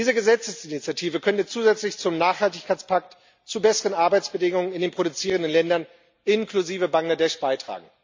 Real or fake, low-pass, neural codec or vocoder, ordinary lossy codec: real; 7.2 kHz; none; MP3, 64 kbps